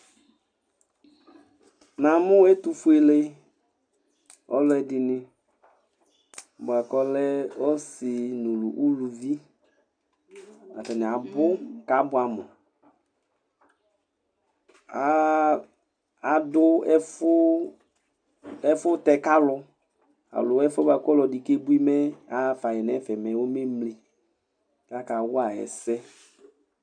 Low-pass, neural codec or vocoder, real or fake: 9.9 kHz; none; real